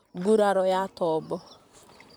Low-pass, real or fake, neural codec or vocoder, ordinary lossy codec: none; fake; vocoder, 44.1 kHz, 128 mel bands, Pupu-Vocoder; none